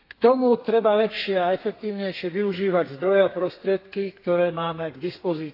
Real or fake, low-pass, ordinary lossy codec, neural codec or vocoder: fake; 5.4 kHz; AAC, 32 kbps; codec, 32 kHz, 1.9 kbps, SNAC